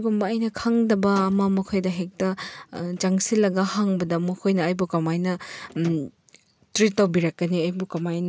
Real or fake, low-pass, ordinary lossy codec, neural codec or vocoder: real; none; none; none